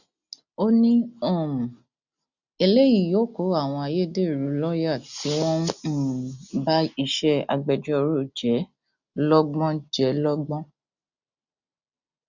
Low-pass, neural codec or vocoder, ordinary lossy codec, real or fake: 7.2 kHz; none; none; real